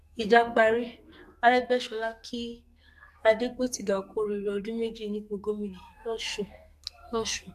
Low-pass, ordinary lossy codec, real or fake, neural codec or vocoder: 14.4 kHz; none; fake; codec, 44.1 kHz, 2.6 kbps, SNAC